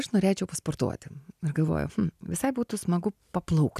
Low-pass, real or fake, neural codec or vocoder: 14.4 kHz; real; none